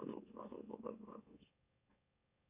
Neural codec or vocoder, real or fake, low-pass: autoencoder, 44.1 kHz, a latent of 192 numbers a frame, MeloTTS; fake; 3.6 kHz